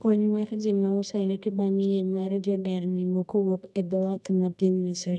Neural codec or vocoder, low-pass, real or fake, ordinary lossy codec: codec, 24 kHz, 0.9 kbps, WavTokenizer, medium music audio release; none; fake; none